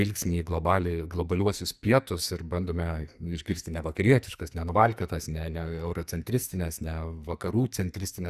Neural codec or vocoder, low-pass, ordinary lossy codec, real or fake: codec, 44.1 kHz, 2.6 kbps, SNAC; 14.4 kHz; Opus, 64 kbps; fake